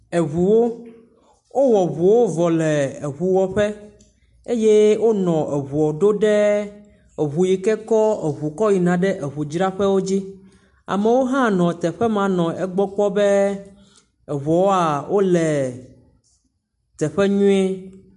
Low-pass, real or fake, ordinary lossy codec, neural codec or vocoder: 10.8 kHz; real; MP3, 64 kbps; none